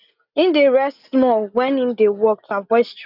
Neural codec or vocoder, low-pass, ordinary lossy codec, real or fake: none; 5.4 kHz; none; real